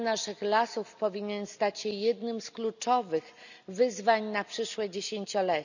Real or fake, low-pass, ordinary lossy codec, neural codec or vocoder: real; 7.2 kHz; none; none